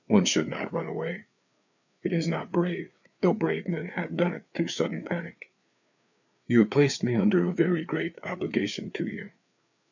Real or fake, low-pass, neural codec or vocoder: fake; 7.2 kHz; codec, 16 kHz, 4 kbps, FreqCodec, larger model